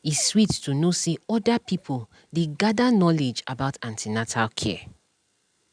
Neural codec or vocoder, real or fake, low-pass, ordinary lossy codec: none; real; 9.9 kHz; none